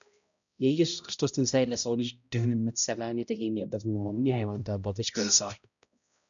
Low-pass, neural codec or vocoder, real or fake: 7.2 kHz; codec, 16 kHz, 0.5 kbps, X-Codec, HuBERT features, trained on balanced general audio; fake